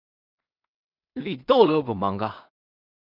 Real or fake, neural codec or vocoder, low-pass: fake; codec, 16 kHz in and 24 kHz out, 0.4 kbps, LongCat-Audio-Codec, two codebook decoder; 5.4 kHz